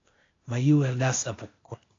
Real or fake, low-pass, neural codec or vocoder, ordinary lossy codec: fake; 7.2 kHz; codec, 16 kHz, 0.8 kbps, ZipCodec; AAC, 32 kbps